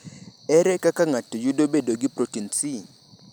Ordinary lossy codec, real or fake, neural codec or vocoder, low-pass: none; real; none; none